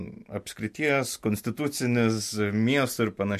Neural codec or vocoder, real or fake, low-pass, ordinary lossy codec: none; real; 14.4 kHz; MP3, 64 kbps